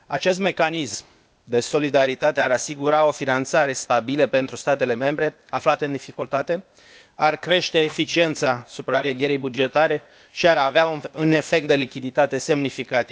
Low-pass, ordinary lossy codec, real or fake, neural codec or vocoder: none; none; fake; codec, 16 kHz, 0.8 kbps, ZipCodec